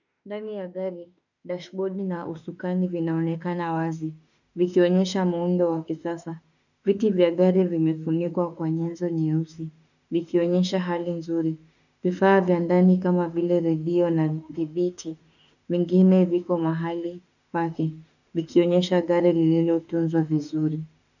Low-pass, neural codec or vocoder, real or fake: 7.2 kHz; autoencoder, 48 kHz, 32 numbers a frame, DAC-VAE, trained on Japanese speech; fake